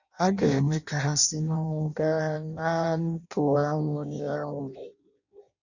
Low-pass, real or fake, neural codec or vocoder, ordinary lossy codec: 7.2 kHz; fake; codec, 16 kHz in and 24 kHz out, 0.6 kbps, FireRedTTS-2 codec; none